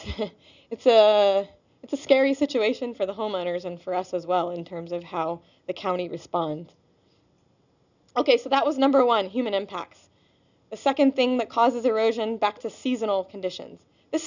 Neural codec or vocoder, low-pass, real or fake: none; 7.2 kHz; real